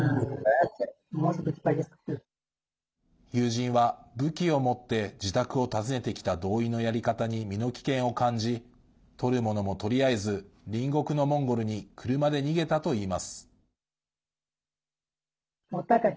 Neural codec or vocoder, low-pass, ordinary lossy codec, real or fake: none; none; none; real